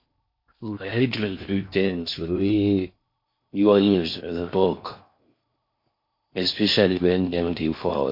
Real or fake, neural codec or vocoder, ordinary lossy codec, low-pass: fake; codec, 16 kHz in and 24 kHz out, 0.6 kbps, FocalCodec, streaming, 4096 codes; MP3, 32 kbps; 5.4 kHz